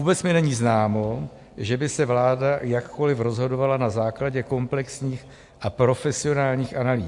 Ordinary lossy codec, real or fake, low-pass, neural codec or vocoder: MP3, 64 kbps; real; 10.8 kHz; none